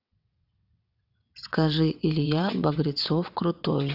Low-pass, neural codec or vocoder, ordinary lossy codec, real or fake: 5.4 kHz; vocoder, 22.05 kHz, 80 mel bands, WaveNeXt; AAC, 48 kbps; fake